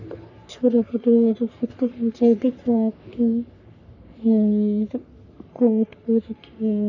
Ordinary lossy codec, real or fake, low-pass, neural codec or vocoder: none; fake; 7.2 kHz; codec, 44.1 kHz, 3.4 kbps, Pupu-Codec